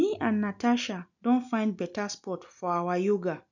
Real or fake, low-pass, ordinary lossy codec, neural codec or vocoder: real; 7.2 kHz; none; none